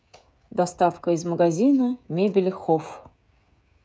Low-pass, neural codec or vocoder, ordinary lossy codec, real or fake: none; codec, 16 kHz, 16 kbps, FreqCodec, smaller model; none; fake